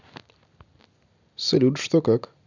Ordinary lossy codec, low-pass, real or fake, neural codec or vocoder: none; 7.2 kHz; real; none